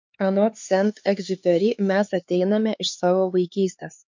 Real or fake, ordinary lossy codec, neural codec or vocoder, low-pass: fake; MP3, 48 kbps; codec, 16 kHz, 4 kbps, X-Codec, HuBERT features, trained on LibriSpeech; 7.2 kHz